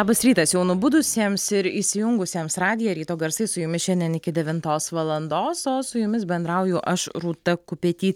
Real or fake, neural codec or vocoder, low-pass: real; none; 19.8 kHz